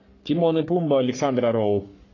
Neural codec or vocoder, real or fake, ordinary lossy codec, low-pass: codec, 44.1 kHz, 3.4 kbps, Pupu-Codec; fake; AAC, 32 kbps; 7.2 kHz